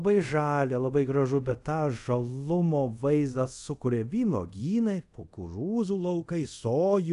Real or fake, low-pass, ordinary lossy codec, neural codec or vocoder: fake; 10.8 kHz; MP3, 64 kbps; codec, 24 kHz, 0.5 kbps, DualCodec